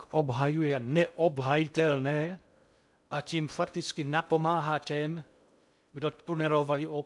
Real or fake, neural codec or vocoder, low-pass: fake; codec, 16 kHz in and 24 kHz out, 0.6 kbps, FocalCodec, streaming, 2048 codes; 10.8 kHz